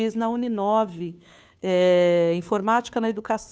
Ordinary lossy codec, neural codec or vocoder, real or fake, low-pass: none; codec, 16 kHz, 2 kbps, FunCodec, trained on Chinese and English, 25 frames a second; fake; none